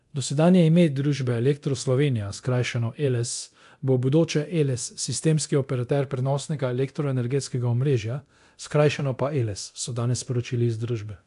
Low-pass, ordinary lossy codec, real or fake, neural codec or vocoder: 10.8 kHz; AAC, 64 kbps; fake; codec, 24 kHz, 0.9 kbps, DualCodec